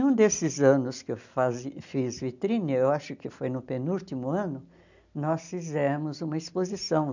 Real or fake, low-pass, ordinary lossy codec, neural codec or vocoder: real; 7.2 kHz; none; none